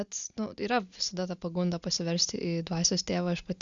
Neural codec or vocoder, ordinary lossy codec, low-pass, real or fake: none; Opus, 64 kbps; 7.2 kHz; real